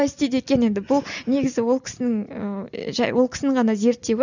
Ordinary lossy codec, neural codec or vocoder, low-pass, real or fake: none; none; 7.2 kHz; real